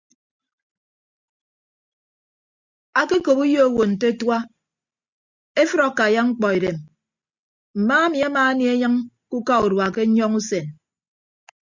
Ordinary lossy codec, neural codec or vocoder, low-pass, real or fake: Opus, 64 kbps; none; 7.2 kHz; real